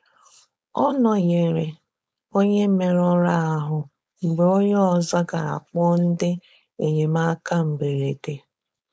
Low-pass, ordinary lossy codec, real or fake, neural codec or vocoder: none; none; fake; codec, 16 kHz, 4.8 kbps, FACodec